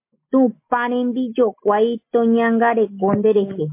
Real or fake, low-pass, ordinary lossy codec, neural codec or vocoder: real; 3.6 kHz; MP3, 24 kbps; none